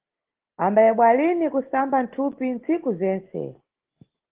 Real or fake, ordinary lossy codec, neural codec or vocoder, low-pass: real; Opus, 16 kbps; none; 3.6 kHz